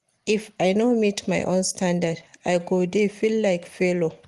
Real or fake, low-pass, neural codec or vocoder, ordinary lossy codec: real; 10.8 kHz; none; Opus, 24 kbps